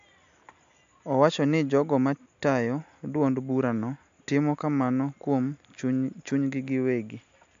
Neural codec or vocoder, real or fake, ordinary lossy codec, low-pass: none; real; AAC, 64 kbps; 7.2 kHz